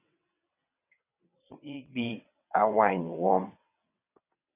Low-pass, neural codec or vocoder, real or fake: 3.6 kHz; vocoder, 22.05 kHz, 80 mel bands, WaveNeXt; fake